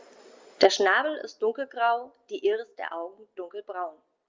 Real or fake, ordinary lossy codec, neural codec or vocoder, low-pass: real; Opus, 32 kbps; none; 7.2 kHz